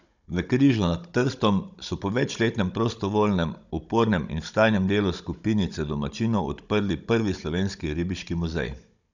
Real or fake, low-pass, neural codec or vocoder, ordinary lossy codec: fake; 7.2 kHz; codec, 16 kHz, 16 kbps, FunCodec, trained on Chinese and English, 50 frames a second; none